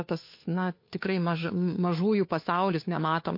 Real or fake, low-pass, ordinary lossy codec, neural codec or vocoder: fake; 5.4 kHz; MP3, 32 kbps; codec, 16 kHz, 2 kbps, FunCodec, trained on Chinese and English, 25 frames a second